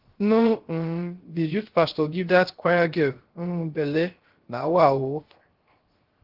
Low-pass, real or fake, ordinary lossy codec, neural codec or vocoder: 5.4 kHz; fake; Opus, 16 kbps; codec, 16 kHz, 0.3 kbps, FocalCodec